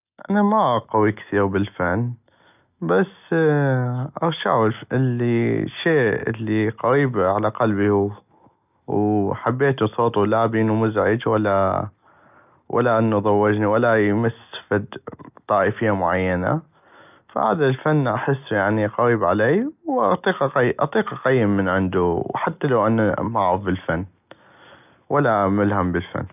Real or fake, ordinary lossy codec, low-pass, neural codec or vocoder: real; none; 3.6 kHz; none